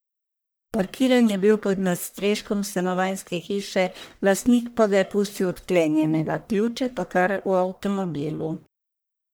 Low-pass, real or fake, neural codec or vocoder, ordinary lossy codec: none; fake; codec, 44.1 kHz, 1.7 kbps, Pupu-Codec; none